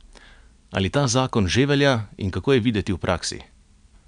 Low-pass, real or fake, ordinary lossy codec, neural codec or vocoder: 9.9 kHz; real; none; none